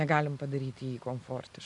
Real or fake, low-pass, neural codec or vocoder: real; 10.8 kHz; none